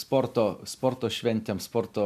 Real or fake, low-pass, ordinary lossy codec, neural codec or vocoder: real; 14.4 kHz; MP3, 96 kbps; none